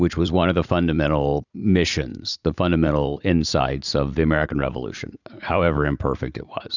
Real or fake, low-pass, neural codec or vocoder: fake; 7.2 kHz; vocoder, 44.1 kHz, 80 mel bands, Vocos